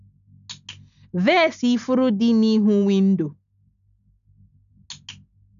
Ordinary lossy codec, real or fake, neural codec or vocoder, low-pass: none; real; none; 7.2 kHz